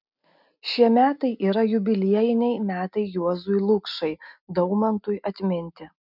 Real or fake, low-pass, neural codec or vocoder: real; 5.4 kHz; none